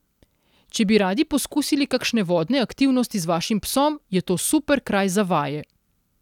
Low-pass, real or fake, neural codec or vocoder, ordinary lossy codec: 19.8 kHz; real; none; none